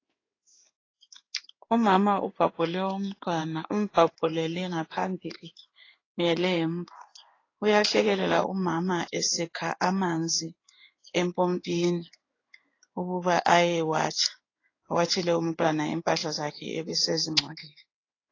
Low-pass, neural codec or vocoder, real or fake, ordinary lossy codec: 7.2 kHz; codec, 16 kHz in and 24 kHz out, 1 kbps, XY-Tokenizer; fake; AAC, 32 kbps